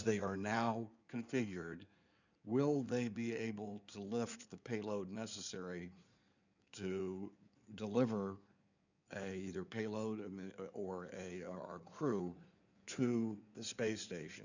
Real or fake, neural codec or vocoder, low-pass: fake; codec, 16 kHz in and 24 kHz out, 2.2 kbps, FireRedTTS-2 codec; 7.2 kHz